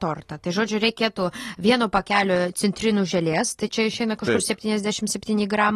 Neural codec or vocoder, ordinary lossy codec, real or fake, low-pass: none; AAC, 32 kbps; real; 19.8 kHz